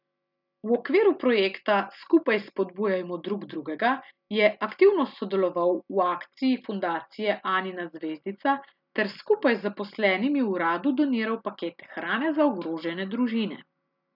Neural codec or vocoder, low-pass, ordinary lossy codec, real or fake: none; 5.4 kHz; none; real